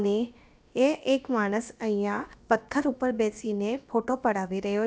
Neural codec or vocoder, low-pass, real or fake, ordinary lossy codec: codec, 16 kHz, about 1 kbps, DyCAST, with the encoder's durations; none; fake; none